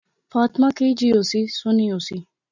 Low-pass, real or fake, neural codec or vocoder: 7.2 kHz; real; none